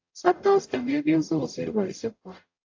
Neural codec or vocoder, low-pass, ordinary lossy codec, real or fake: codec, 44.1 kHz, 0.9 kbps, DAC; 7.2 kHz; AAC, 48 kbps; fake